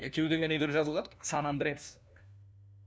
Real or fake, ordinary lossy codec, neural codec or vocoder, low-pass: fake; none; codec, 16 kHz, 1 kbps, FunCodec, trained on LibriTTS, 50 frames a second; none